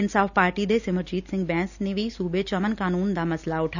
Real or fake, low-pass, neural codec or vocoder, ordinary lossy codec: real; 7.2 kHz; none; none